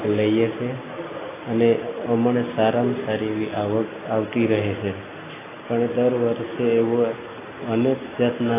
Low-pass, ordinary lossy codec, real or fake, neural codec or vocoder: 3.6 kHz; AAC, 16 kbps; real; none